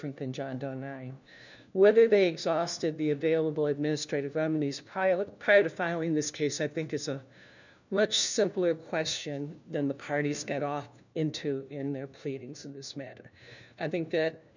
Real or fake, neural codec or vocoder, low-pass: fake; codec, 16 kHz, 1 kbps, FunCodec, trained on LibriTTS, 50 frames a second; 7.2 kHz